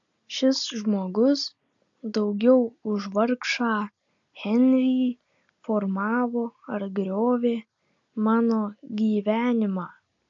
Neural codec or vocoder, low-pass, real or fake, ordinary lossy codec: none; 7.2 kHz; real; AAC, 64 kbps